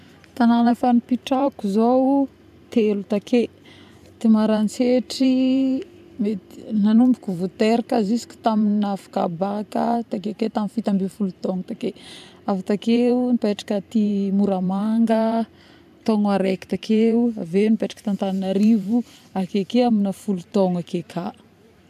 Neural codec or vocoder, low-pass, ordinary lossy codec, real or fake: vocoder, 44.1 kHz, 128 mel bands every 512 samples, BigVGAN v2; 14.4 kHz; none; fake